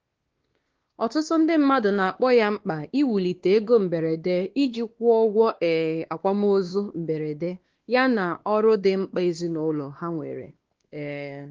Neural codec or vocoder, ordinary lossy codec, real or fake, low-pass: codec, 16 kHz, 2 kbps, X-Codec, WavLM features, trained on Multilingual LibriSpeech; Opus, 16 kbps; fake; 7.2 kHz